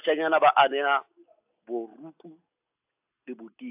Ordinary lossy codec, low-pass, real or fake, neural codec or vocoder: AAC, 24 kbps; 3.6 kHz; real; none